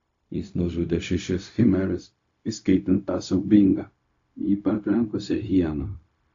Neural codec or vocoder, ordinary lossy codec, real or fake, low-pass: codec, 16 kHz, 0.4 kbps, LongCat-Audio-Codec; AAC, 48 kbps; fake; 7.2 kHz